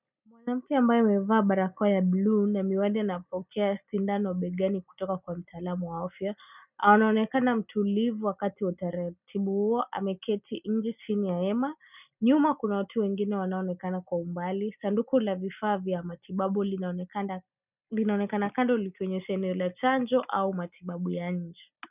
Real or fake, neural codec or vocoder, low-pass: real; none; 3.6 kHz